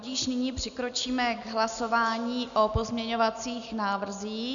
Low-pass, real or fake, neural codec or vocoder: 7.2 kHz; real; none